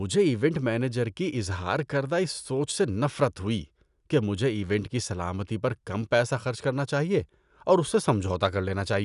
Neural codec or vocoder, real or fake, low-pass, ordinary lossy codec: none; real; 10.8 kHz; none